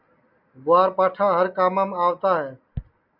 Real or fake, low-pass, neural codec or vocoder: real; 5.4 kHz; none